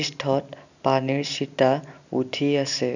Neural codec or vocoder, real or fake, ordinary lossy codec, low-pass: none; real; none; 7.2 kHz